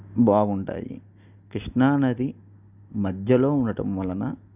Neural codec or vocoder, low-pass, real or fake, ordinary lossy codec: none; 3.6 kHz; real; none